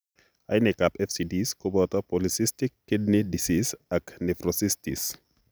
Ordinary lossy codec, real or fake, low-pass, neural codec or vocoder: none; real; none; none